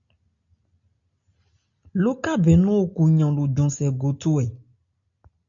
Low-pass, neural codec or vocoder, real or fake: 7.2 kHz; none; real